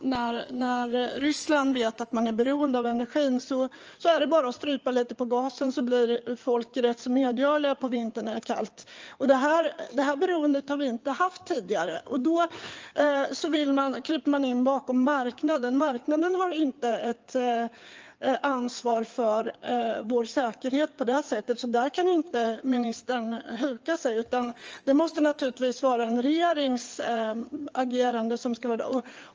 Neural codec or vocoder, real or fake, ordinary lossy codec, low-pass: codec, 16 kHz in and 24 kHz out, 2.2 kbps, FireRedTTS-2 codec; fake; Opus, 24 kbps; 7.2 kHz